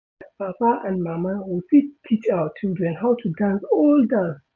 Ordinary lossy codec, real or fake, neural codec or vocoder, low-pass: none; real; none; 7.2 kHz